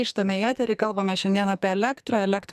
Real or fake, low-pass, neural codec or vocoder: fake; 14.4 kHz; codec, 44.1 kHz, 2.6 kbps, SNAC